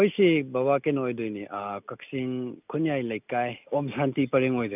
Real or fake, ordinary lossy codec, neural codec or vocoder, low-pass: real; none; none; 3.6 kHz